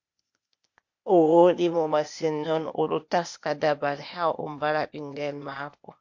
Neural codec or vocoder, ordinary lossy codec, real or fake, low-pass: codec, 16 kHz, 0.8 kbps, ZipCodec; MP3, 48 kbps; fake; 7.2 kHz